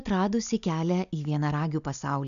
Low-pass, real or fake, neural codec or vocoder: 7.2 kHz; real; none